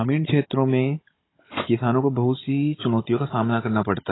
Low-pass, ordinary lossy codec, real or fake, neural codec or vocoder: 7.2 kHz; AAC, 16 kbps; fake; codec, 16 kHz, 16 kbps, FunCodec, trained on Chinese and English, 50 frames a second